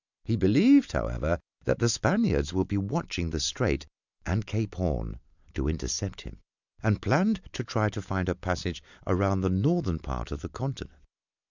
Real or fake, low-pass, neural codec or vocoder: real; 7.2 kHz; none